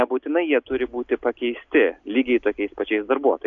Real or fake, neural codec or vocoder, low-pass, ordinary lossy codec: real; none; 7.2 kHz; AAC, 64 kbps